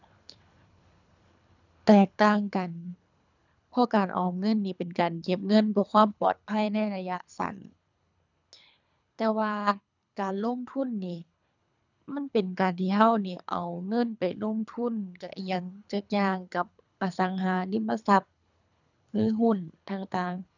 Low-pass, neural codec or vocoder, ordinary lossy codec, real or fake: 7.2 kHz; codec, 24 kHz, 3 kbps, HILCodec; none; fake